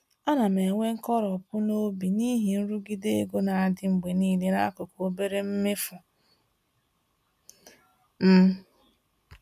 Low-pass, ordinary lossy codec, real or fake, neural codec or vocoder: 14.4 kHz; MP3, 96 kbps; real; none